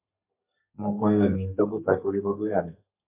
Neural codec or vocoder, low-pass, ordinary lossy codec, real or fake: codec, 32 kHz, 1.9 kbps, SNAC; 3.6 kHz; MP3, 32 kbps; fake